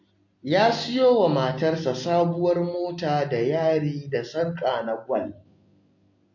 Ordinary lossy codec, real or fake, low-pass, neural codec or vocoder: MP3, 48 kbps; real; 7.2 kHz; none